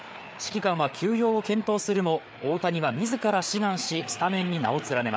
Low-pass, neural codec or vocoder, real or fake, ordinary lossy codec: none; codec, 16 kHz, 4 kbps, FreqCodec, larger model; fake; none